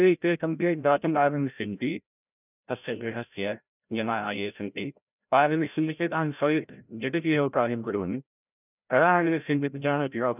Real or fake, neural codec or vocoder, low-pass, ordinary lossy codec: fake; codec, 16 kHz, 0.5 kbps, FreqCodec, larger model; 3.6 kHz; none